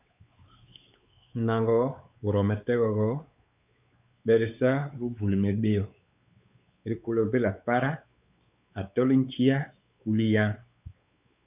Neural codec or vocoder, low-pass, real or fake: codec, 16 kHz, 4 kbps, X-Codec, WavLM features, trained on Multilingual LibriSpeech; 3.6 kHz; fake